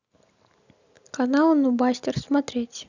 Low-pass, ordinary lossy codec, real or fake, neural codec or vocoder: 7.2 kHz; none; real; none